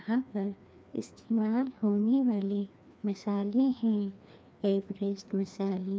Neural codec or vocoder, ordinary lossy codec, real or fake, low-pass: codec, 16 kHz, 2 kbps, FreqCodec, larger model; none; fake; none